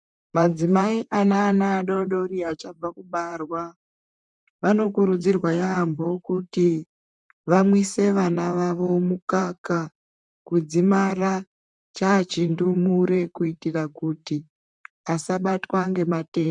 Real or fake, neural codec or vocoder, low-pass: fake; vocoder, 44.1 kHz, 128 mel bands, Pupu-Vocoder; 10.8 kHz